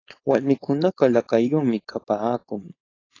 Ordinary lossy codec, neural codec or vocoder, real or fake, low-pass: AAC, 32 kbps; codec, 16 kHz, 4.8 kbps, FACodec; fake; 7.2 kHz